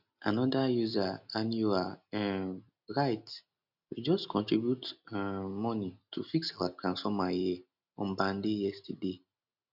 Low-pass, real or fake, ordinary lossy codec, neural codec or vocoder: 5.4 kHz; real; none; none